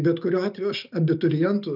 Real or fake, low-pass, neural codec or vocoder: real; 5.4 kHz; none